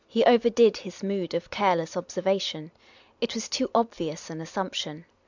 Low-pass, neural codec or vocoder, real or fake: 7.2 kHz; none; real